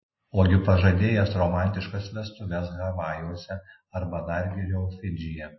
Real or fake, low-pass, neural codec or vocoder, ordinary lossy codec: real; 7.2 kHz; none; MP3, 24 kbps